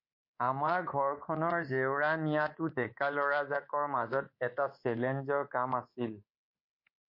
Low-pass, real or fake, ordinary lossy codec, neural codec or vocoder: 5.4 kHz; fake; MP3, 32 kbps; codec, 24 kHz, 3.1 kbps, DualCodec